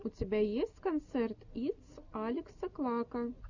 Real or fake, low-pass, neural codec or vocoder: real; 7.2 kHz; none